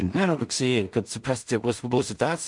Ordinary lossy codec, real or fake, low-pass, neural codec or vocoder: MP3, 64 kbps; fake; 10.8 kHz; codec, 16 kHz in and 24 kHz out, 0.4 kbps, LongCat-Audio-Codec, two codebook decoder